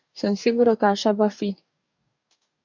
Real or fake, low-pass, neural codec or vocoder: fake; 7.2 kHz; codec, 44.1 kHz, 2.6 kbps, DAC